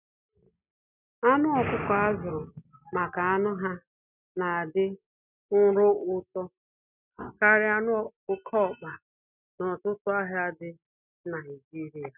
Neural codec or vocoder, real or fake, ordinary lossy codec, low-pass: none; real; none; 3.6 kHz